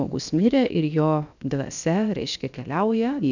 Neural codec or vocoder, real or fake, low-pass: codec, 16 kHz, about 1 kbps, DyCAST, with the encoder's durations; fake; 7.2 kHz